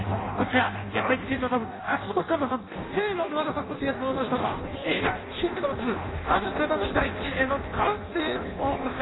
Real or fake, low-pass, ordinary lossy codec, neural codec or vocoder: fake; 7.2 kHz; AAC, 16 kbps; codec, 16 kHz in and 24 kHz out, 0.6 kbps, FireRedTTS-2 codec